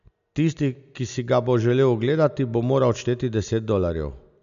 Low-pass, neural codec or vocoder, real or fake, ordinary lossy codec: 7.2 kHz; none; real; AAC, 96 kbps